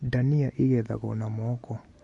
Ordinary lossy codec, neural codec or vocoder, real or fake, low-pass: MP3, 48 kbps; none; real; 10.8 kHz